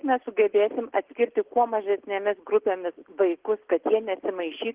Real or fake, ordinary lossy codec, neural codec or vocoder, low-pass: real; Opus, 16 kbps; none; 3.6 kHz